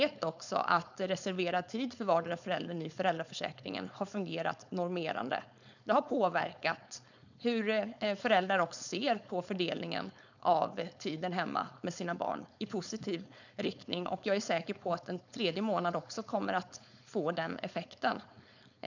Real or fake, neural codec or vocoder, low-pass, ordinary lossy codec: fake; codec, 16 kHz, 4.8 kbps, FACodec; 7.2 kHz; none